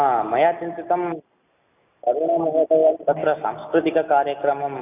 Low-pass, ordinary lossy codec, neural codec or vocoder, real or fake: 3.6 kHz; none; none; real